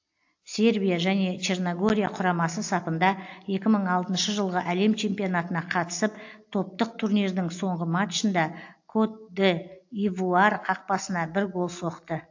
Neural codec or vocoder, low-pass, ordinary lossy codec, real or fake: none; 7.2 kHz; AAC, 48 kbps; real